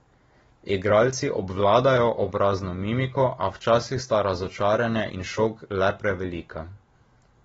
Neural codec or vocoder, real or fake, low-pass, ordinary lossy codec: none; real; 19.8 kHz; AAC, 24 kbps